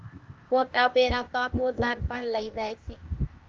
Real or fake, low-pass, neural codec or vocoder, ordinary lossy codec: fake; 7.2 kHz; codec, 16 kHz, 0.8 kbps, ZipCodec; Opus, 24 kbps